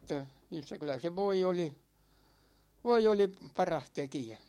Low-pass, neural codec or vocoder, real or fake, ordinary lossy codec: 19.8 kHz; codec, 44.1 kHz, 7.8 kbps, DAC; fake; MP3, 64 kbps